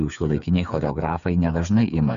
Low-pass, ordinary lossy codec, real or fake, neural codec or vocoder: 7.2 kHz; MP3, 64 kbps; fake; codec, 16 kHz, 8 kbps, FreqCodec, smaller model